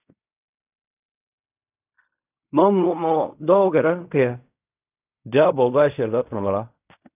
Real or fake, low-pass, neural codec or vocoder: fake; 3.6 kHz; codec, 16 kHz in and 24 kHz out, 0.4 kbps, LongCat-Audio-Codec, fine tuned four codebook decoder